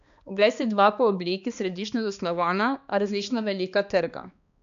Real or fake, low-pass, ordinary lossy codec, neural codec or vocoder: fake; 7.2 kHz; AAC, 96 kbps; codec, 16 kHz, 2 kbps, X-Codec, HuBERT features, trained on balanced general audio